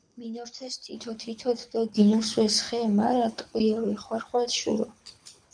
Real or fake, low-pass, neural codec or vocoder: fake; 9.9 kHz; codec, 24 kHz, 6 kbps, HILCodec